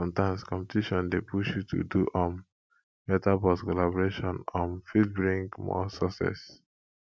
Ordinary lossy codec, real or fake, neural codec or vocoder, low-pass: none; real; none; none